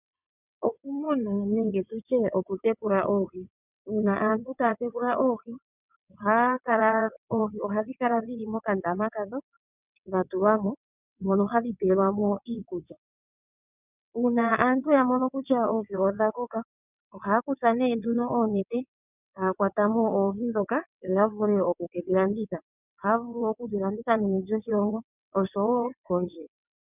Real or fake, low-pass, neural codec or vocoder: fake; 3.6 kHz; vocoder, 22.05 kHz, 80 mel bands, WaveNeXt